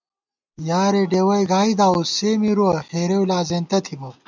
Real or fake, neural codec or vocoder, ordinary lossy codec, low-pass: real; none; MP3, 64 kbps; 7.2 kHz